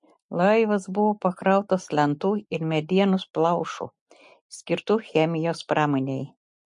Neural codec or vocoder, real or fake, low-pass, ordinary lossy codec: none; real; 10.8 kHz; MP3, 48 kbps